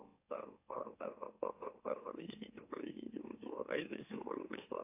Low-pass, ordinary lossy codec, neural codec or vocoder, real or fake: 3.6 kHz; AAC, 32 kbps; autoencoder, 44.1 kHz, a latent of 192 numbers a frame, MeloTTS; fake